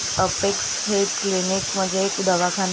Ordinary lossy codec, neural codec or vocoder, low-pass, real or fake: none; none; none; real